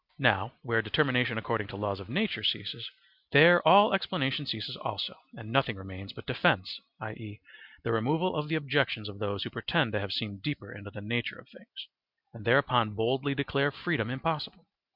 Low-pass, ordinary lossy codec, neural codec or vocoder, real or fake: 5.4 kHz; Opus, 64 kbps; none; real